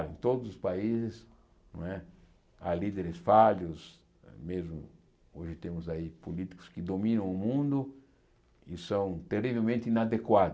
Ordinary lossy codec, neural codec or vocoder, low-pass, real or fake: none; none; none; real